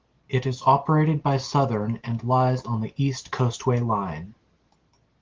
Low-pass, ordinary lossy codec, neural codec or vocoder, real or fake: 7.2 kHz; Opus, 16 kbps; none; real